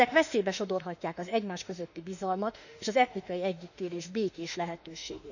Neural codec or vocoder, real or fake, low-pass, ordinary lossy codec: autoencoder, 48 kHz, 32 numbers a frame, DAC-VAE, trained on Japanese speech; fake; 7.2 kHz; none